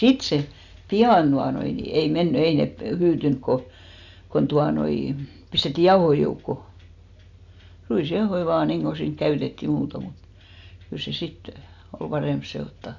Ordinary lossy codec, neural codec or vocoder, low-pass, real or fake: none; none; 7.2 kHz; real